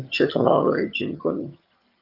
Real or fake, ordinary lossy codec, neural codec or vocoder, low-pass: fake; Opus, 24 kbps; vocoder, 22.05 kHz, 80 mel bands, HiFi-GAN; 5.4 kHz